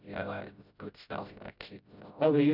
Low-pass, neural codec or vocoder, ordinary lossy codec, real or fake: 5.4 kHz; codec, 16 kHz, 0.5 kbps, FreqCodec, smaller model; none; fake